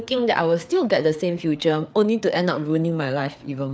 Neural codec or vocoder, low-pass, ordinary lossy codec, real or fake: codec, 16 kHz, 4 kbps, FreqCodec, larger model; none; none; fake